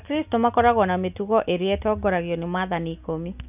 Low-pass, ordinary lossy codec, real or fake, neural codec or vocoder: 3.6 kHz; none; real; none